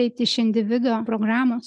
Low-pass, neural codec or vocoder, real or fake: 10.8 kHz; none; real